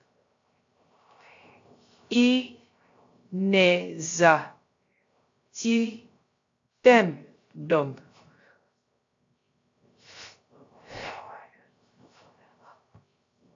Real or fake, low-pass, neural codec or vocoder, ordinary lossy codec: fake; 7.2 kHz; codec, 16 kHz, 0.3 kbps, FocalCodec; AAC, 48 kbps